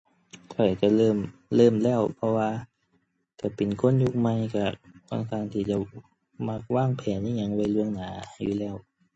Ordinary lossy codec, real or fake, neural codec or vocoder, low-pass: MP3, 32 kbps; real; none; 10.8 kHz